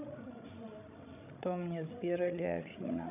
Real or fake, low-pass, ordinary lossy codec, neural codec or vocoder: fake; 3.6 kHz; none; codec, 16 kHz, 16 kbps, FreqCodec, larger model